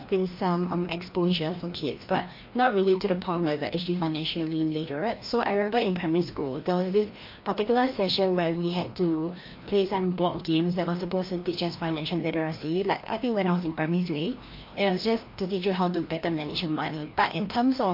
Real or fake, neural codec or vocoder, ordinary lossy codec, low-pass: fake; codec, 16 kHz, 1 kbps, FreqCodec, larger model; MP3, 32 kbps; 5.4 kHz